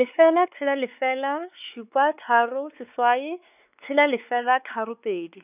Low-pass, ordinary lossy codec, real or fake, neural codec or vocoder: 3.6 kHz; none; fake; codec, 16 kHz, 4 kbps, X-Codec, WavLM features, trained on Multilingual LibriSpeech